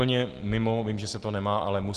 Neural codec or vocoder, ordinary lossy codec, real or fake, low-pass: none; Opus, 16 kbps; real; 10.8 kHz